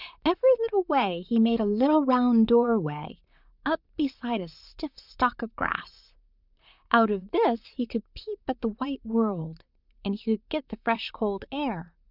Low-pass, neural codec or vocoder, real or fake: 5.4 kHz; vocoder, 44.1 kHz, 128 mel bands, Pupu-Vocoder; fake